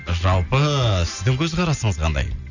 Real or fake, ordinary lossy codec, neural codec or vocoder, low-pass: real; none; none; 7.2 kHz